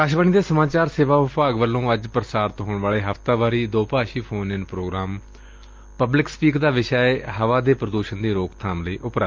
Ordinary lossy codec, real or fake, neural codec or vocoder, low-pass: Opus, 32 kbps; real; none; 7.2 kHz